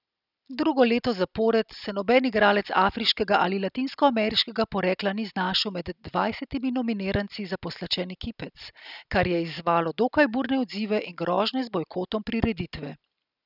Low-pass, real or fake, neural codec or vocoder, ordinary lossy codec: 5.4 kHz; real; none; none